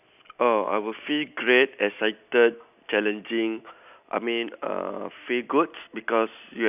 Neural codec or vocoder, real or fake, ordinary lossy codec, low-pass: none; real; none; 3.6 kHz